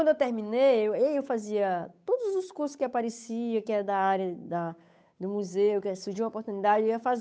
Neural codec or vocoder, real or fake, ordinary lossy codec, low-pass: codec, 16 kHz, 8 kbps, FunCodec, trained on Chinese and English, 25 frames a second; fake; none; none